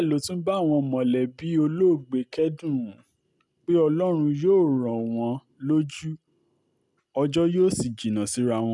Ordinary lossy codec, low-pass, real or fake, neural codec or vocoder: none; none; real; none